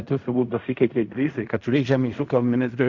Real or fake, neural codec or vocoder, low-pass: fake; codec, 16 kHz in and 24 kHz out, 0.4 kbps, LongCat-Audio-Codec, fine tuned four codebook decoder; 7.2 kHz